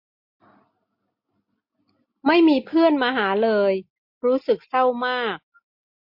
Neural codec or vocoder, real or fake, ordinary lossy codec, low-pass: none; real; MP3, 32 kbps; 5.4 kHz